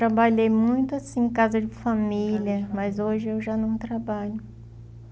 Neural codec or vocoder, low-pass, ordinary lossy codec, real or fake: none; none; none; real